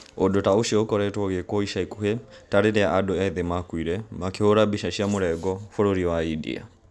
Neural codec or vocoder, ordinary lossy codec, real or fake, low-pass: none; none; real; none